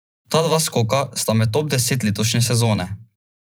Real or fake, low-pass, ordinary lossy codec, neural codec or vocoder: fake; none; none; vocoder, 44.1 kHz, 128 mel bands every 512 samples, BigVGAN v2